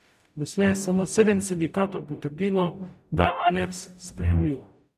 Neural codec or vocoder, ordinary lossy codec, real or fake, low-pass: codec, 44.1 kHz, 0.9 kbps, DAC; none; fake; 14.4 kHz